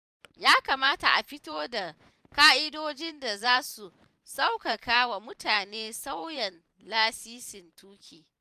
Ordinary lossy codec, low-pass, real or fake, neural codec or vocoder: none; 14.4 kHz; fake; vocoder, 44.1 kHz, 128 mel bands every 512 samples, BigVGAN v2